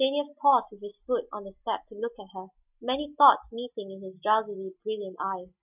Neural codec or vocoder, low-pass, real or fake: none; 3.6 kHz; real